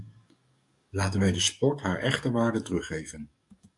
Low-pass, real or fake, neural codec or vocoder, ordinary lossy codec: 10.8 kHz; fake; codec, 44.1 kHz, 7.8 kbps, DAC; AAC, 64 kbps